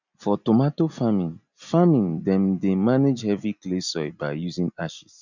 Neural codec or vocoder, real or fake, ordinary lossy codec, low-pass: none; real; none; 7.2 kHz